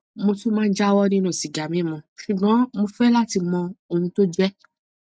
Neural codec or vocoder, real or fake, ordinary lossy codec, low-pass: none; real; none; none